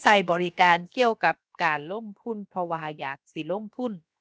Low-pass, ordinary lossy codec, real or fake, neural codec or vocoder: none; none; fake; codec, 16 kHz, 0.7 kbps, FocalCodec